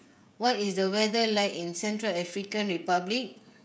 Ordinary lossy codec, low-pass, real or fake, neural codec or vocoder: none; none; fake; codec, 16 kHz, 16 kbps, FreqCodec, smaller model